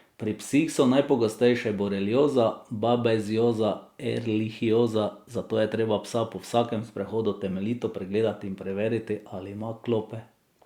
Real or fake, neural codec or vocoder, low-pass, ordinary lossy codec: real; none; 19.8 kHz; Opus, 64 kbps